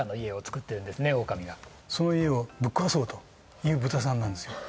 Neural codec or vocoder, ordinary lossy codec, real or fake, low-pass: none; none; real; none